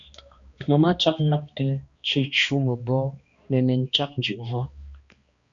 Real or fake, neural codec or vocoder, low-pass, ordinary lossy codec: fake; codec, 16 kHz, 2 kbps, X-Codec, HuBERT features, trained on balanced general audio; 7.2 kHz; Opus, 64 kbps